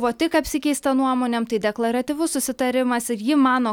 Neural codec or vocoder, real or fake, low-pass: none; real; 19.8 kHz